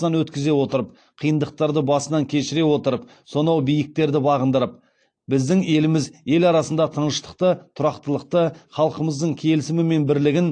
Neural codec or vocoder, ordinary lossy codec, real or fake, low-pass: none; AAC, 48 kbps; real; 9.9 kHz